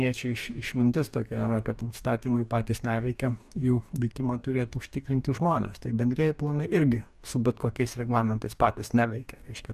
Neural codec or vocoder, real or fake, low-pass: codec, 44.1 kHz, 2.6 kbps, DAC; fake; 14.4 kHz